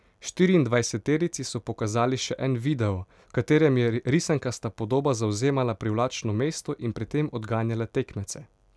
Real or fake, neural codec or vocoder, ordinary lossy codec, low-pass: real; none; none; none